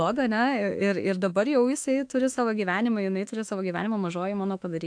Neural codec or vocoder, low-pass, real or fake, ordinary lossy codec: autoencoder, 48 kHz, 32 numbers a frame, DAC-VAE, trained on Japanese speech; 9.9 kHz; fake; AAC, 64 kbps